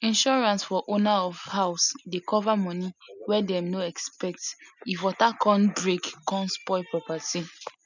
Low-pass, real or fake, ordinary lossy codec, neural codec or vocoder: 7.2 kHz; real; none; none